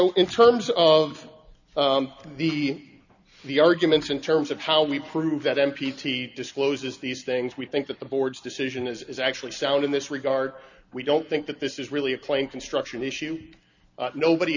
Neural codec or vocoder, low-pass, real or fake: none; 7.2 kHz; real